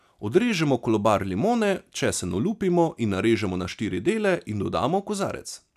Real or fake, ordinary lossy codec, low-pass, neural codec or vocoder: real; none; 14.4 kHz; none